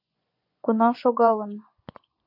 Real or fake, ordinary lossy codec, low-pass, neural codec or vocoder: fake; MP3, 32 kbps; 5.4 kHz; vocoder, 24 kHz, 100 mel bands, Vocos